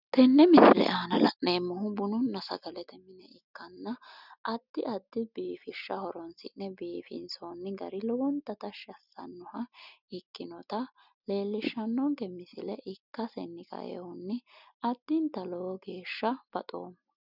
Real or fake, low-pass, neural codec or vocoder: real; 5.4 kHz; none